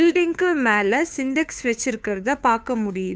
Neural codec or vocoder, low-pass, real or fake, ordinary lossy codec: codec, 16 kHz, 2 kbps, FunCodec, trained on Chinese and English, 25 frames a second; none; fake; none